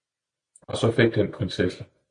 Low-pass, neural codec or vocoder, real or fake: 9.9 kHz; none; real